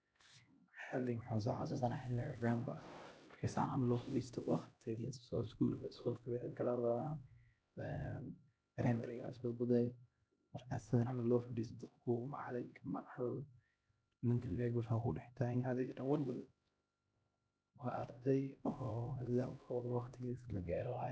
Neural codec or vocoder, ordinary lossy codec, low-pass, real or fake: codec, 16 kHz, 1 kbps, X-Codec, HuBERT features, trained on LibriSpeech; none; none; fake